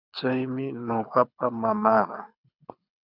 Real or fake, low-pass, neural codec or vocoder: fake; 5.4 kHz; codec, 24 kHz, 6 kbps, HILCodec